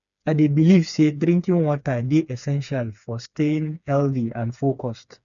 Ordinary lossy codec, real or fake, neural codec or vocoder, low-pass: none; fake; codec, 16 kHz, 4 kbps, FreqCodec, smaller model; 7.2 kHz